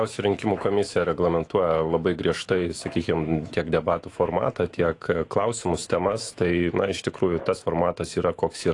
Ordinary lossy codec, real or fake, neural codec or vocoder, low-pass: AAC, 48 kbps; fake; autoencoder, 48 kHz, 128 numbers a frame, DAC-VAE, trained on Japanese speech; 10.8 kHz